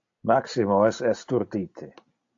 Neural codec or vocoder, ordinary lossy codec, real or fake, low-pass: none; AAC, 48 kbps; real; 7.2 kHz